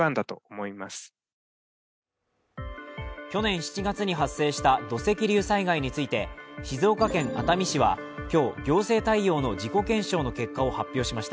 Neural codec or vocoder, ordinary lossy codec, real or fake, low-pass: none; none; real; none